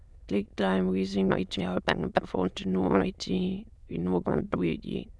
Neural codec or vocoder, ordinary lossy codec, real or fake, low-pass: autoencoder, 22.05 kHz, a latent of 192 numbers a frame, VITS, trained on many speakers; none; fake; none